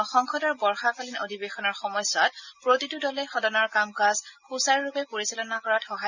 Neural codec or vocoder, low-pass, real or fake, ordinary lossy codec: none; 7.2 kHz; real; Opus, 64 kbps